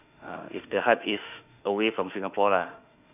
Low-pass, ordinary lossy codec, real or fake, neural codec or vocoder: 3.6 kHz; none; fake; autoencoder, 48 kHz, 32 numbers a frame, DAC-VAE, trained on Japanese speech